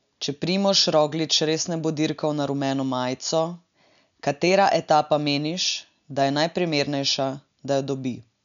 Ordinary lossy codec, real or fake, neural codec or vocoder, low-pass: none; real; none; 7.2 kHz